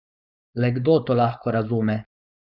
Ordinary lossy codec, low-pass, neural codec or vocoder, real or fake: none; 5.4 kHz; codec, 16 kHz, 4.8 kbps, FACodec; fake